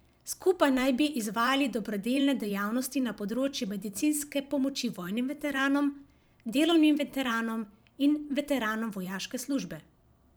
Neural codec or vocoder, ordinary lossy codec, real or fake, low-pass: vocoder, 44.1 kHz, 128 mel bands every 512 samples, BigVGAN v2; none; fake; none